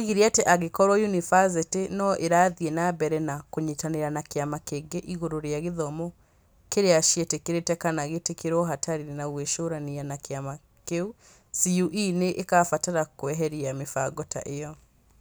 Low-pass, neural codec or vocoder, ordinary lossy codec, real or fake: none; none; none; real